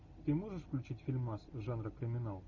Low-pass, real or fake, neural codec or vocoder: 7.2 kHz; real; none